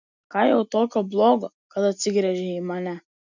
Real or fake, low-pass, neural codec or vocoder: real; 7.2 kHz; none